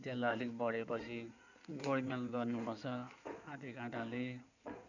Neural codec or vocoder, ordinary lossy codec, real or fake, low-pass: codec, 16 kHz in and 24 kHz out, 2.2 kbps, FireRedTTS-2 codec; none; fake; 7.2 kHz